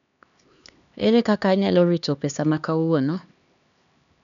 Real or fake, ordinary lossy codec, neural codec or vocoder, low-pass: fake; none; codec, 16 kHz, 2 kbps, X-Codec, HuBERT features, trained on LibriSpeech; 7.2 kHz